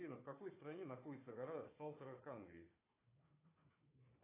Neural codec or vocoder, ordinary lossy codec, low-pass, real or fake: codec, 16 kHz, 4 kbps, FunCodec, trained on Chinese and English, 50 frames a second; AAC, 16 kbps; 3.6 kHz; fake